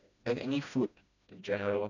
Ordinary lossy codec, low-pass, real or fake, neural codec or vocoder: none; 7.2 kHz; fake; codec, 16 kHz, 1 kbps, FreqCodec, smaller model